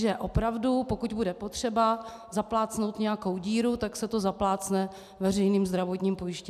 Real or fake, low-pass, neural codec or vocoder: real; 14.4 kHz; none